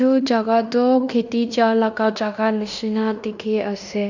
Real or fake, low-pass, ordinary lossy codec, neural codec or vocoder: fake; 7.2 kHz; none; codec, 16 kHz in and 24 kHz out, 0.9 kbps, LongCat-Audio-Codec, fine tuned four codebook decoder